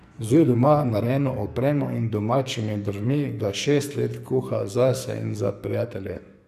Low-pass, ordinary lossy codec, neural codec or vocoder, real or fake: 14.4 kHz; none; codec, 44.1 kHz, 2.6 kbps, SNAC; fake